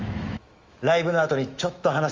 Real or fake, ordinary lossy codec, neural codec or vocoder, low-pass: fake; Opus, 32 kbps; autoencoder, 48 kHz, 128 numbers a frame, DAC-VAE, trained on Japanese speech; 7.2 kHz